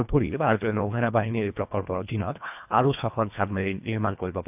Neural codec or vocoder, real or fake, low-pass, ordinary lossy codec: codec, 24 kHz, 1.5 kbps, HILCodec; fake; 3.6 kHz; AAC, 32 kbps